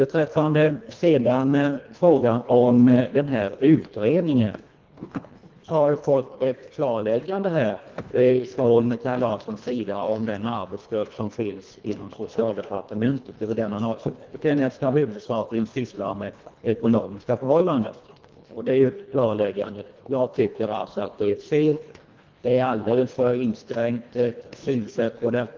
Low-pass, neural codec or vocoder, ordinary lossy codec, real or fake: 7.2 kHz; codec, 24 kHz, 1.5 kbps, HILCodec; Opus, 32 kbps; fake